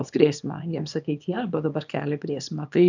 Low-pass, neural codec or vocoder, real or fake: 7.2 kHz; codec, 24 kHz, 0.9 kbps, WavTokenizer, small release; fake